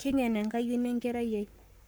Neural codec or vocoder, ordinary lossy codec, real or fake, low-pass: codec, 44.1 kHz, 7.8 kbps, Pupu-Codec; none; fake; none